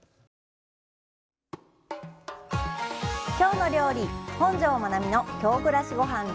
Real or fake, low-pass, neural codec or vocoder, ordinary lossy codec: real; none; none; none